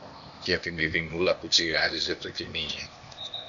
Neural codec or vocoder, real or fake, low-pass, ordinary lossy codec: codec, 16 kHz, 0.8 kbps, ZipCodec; fake; 7.2 kHz; Opus, 64 kbps